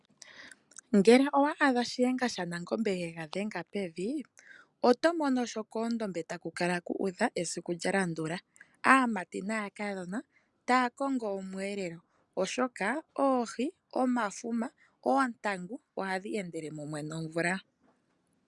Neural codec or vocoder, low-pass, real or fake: none; 10.8 kHz; real